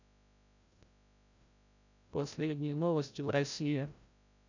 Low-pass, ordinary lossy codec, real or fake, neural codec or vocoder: 7.2 kHz; none; fake; codec, 16 kHz, 0.5 kbps, FreqCodec, larger model